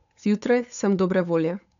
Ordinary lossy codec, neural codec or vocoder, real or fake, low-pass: none; codec, 16 kHz, 8 kbps, FunCodec, trained on Chinese and English, 25 frames a second; fake; 7.2 kHz